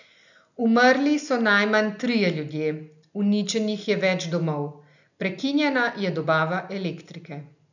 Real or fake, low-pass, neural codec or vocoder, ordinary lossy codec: real; 7.2 kHz; none; none